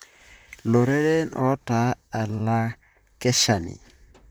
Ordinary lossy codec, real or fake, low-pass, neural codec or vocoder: none; fake; none; vocoder, 44.1 kHz, 128 mel bands, Pupu-Vocoder